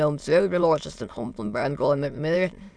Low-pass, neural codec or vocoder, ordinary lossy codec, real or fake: none; autoencoder, 22.05 kHz, a latent of 192 numbers a frame, VITS, trained on many speakers; none; fake